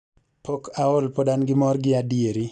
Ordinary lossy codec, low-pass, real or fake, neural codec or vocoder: none; 9.9 kHz; real; none